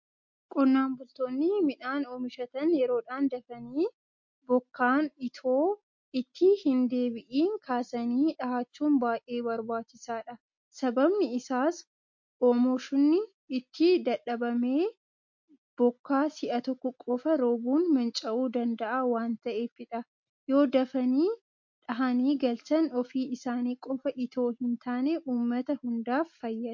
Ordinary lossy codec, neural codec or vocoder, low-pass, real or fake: MP3, 48 kbps; none; 7.2 kHz; real